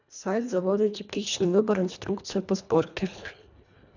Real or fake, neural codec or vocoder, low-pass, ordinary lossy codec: fake; codec, 24 kHz, 1.5 kbps, HILCodec; 7.2 kHz; none